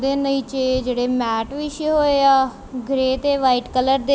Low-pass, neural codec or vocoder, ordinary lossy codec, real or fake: none; none; none; real